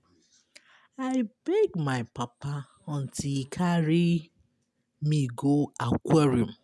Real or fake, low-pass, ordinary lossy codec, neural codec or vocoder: real; none; none; none